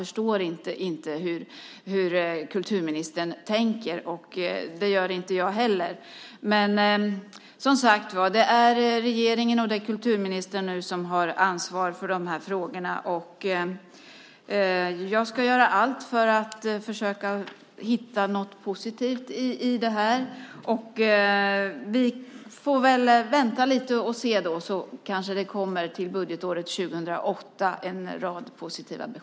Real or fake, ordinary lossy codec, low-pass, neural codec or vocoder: real; none; none; none